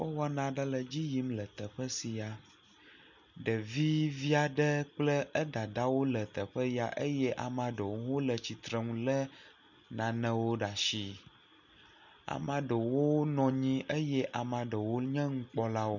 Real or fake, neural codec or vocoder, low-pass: real; none; 7.2 kHz